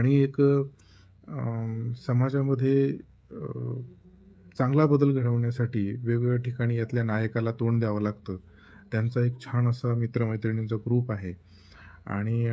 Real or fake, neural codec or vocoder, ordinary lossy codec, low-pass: fake; codec, 16 kHz, 16 kbps, FreqCodec, smaller model; none; none